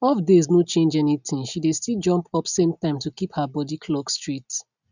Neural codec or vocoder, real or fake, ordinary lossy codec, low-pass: none; real; none; 7.2 kHz